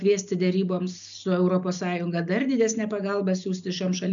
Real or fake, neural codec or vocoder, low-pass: real; none; 7.2 kHz